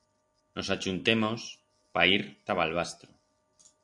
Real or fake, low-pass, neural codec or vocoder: real; 10.8 kHz; none